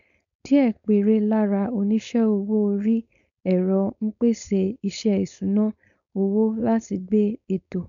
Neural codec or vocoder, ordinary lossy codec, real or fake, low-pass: codec, 16 kHz, 4.8 kbps, FACodec; none; fake; 7.2 kHz